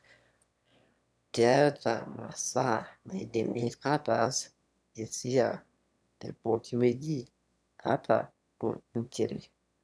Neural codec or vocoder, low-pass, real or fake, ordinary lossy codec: autoencoder, 22.05 kHz, a latent of 192 numbers a frame, VITS, trained on one speaker; none; fake; none